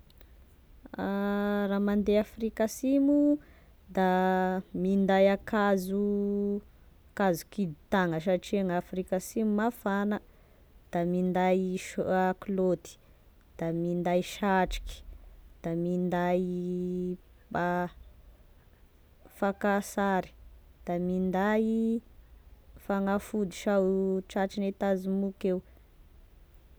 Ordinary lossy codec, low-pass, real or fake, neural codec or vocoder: none; none; real; none